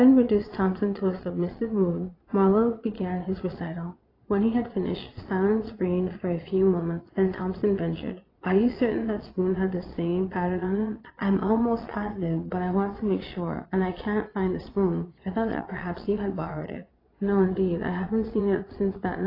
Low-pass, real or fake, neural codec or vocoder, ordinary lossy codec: 5.4 kHz; fake; vocoder, 22.05 kHz, 80 mel bands, Vocos; AAC, 24 kbps